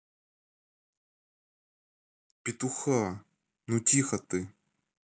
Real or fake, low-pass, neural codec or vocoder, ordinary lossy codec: real; none; none; none